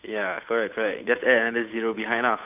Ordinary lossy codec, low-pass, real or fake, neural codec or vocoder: none; 3.6 kHz; fake; codec, 16 kHz, 8 kbps, FunCodec, trained on Chinese and English, 25 frames a second